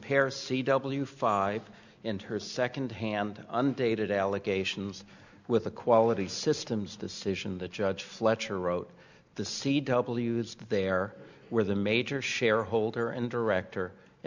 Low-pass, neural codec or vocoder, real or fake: 7.2 kHz; none; real